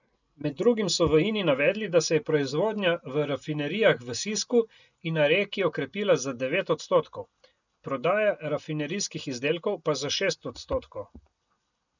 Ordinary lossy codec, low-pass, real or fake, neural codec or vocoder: none; 7.2 kHz; real; none